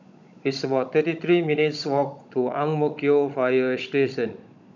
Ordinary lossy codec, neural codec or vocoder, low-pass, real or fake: none; codec, 16 kHz, 16 kbps, FunCodec, trained on Chinese and English, 50 frames a second; 7.2 kHz; fake